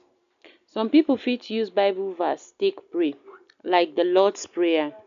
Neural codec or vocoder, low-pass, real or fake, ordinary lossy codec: none; 7.2 kHz; real; none